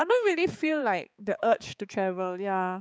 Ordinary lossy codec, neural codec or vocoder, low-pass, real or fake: none; codec, 16 kHz, 4 kbps, X-Codec, HuBERT features, trained on balanced general audio; none; fake